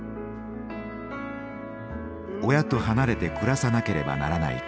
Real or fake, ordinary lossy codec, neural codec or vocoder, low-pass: real; none; none; none